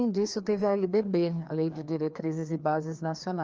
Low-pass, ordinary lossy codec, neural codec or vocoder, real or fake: 7.2 kHz; Opus, 24 kbps; codec, 16 kHz, 2 kbps, FreqCodec, larger model; fake